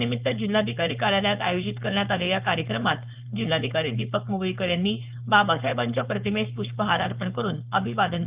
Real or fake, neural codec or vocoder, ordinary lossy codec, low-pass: fake; codec, 16 kHz, 4.8 kbps, FACodec; Opus, 24 kbps; 3.6 kHz